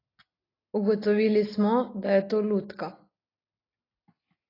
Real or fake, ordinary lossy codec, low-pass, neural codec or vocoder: real; AAC, 24 kbps; 5.4 kHz; none